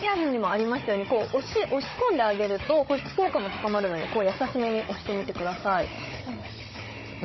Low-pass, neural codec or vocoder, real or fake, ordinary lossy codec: 7.2 kHz; codec, 16 kHz, 16 kbps, FunCodec, trained on Chinese and English, 50 frames a second; fake; MP3, 24 kbps